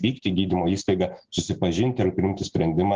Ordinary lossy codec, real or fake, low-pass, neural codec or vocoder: Opus, 16 kbps; real; 7.2 kHz; none